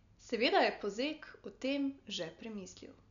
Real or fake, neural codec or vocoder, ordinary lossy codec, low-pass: real; none; none; 7.2 kHz